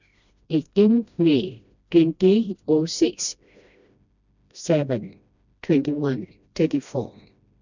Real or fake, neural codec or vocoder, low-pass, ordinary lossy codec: fake; codec, 16 kHz, 1 kbps, FreqCodec, smaller model; 7.2 kHz; none